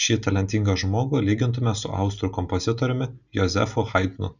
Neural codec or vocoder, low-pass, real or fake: none; 7.2 kHz; real